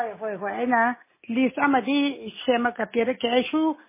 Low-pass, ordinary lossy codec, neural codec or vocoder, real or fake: 3.6 kHz; MP3, 16 kbps; none; real